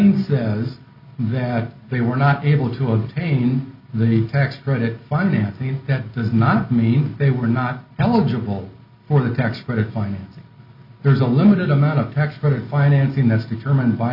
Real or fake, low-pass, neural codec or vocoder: real; 5.4 kHz; none